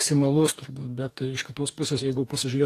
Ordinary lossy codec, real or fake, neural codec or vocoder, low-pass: AAC, 48 kbps; fake; codec, 44.1 kHz, 2.6 kbps, DAC; 14.4 kHz